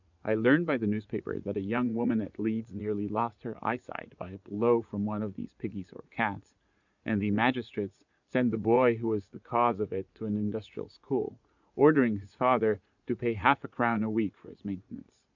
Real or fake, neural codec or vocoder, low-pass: fake; vocoder, 44.1 kHz, 80 mel bands, Vocos; 7.2 kHz